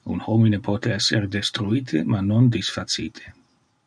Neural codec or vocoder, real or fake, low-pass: none; real; 9.9 kHz